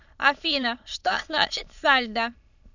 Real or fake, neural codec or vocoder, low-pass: fake; autoencoder, 22.05 kHz, a latent of 192 numbers a frame, VITS, trained on many speakers; 7.2 kHz